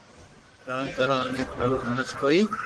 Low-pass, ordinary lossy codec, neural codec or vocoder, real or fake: 10.8 kHz; Opus, 16 kbps; codec, 44.1 kHz, 1.7 kbps, Pupu-Codec; fake